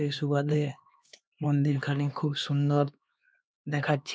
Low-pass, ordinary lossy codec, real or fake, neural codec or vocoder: none; none; fake; codec, 16 kHz, 2 kbps, X-Codec, HuBERT features, trained on LibriSpeech